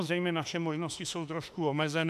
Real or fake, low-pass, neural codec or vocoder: fake; 14.4 kHz; autoencoder, 48 kHz, 32 numbers a frame, DAC-VAE, trained on Japanese speech